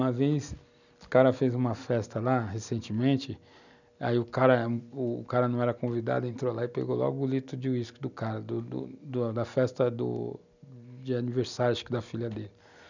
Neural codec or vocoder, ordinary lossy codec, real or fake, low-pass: none; none; real; 7.2 kHz